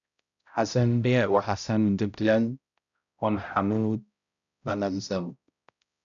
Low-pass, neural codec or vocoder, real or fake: 7.2 kHz; codec, 16 kHz, 0.5 kbps, X-Codec, HuBERT features, trained on balanced general audio; fake